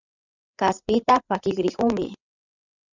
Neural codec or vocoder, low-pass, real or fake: codec, 16 kHz, 8 kbps, FreqCodec, larger model; 7.2 kHz; fake